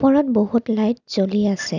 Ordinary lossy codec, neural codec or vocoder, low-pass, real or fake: none; none; 7.2 kHz; real